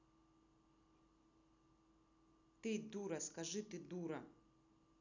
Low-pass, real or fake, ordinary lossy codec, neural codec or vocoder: 7.2 kHz; real; none; none